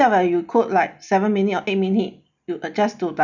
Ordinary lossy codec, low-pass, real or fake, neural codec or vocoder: none; 7.2 kHz; real; none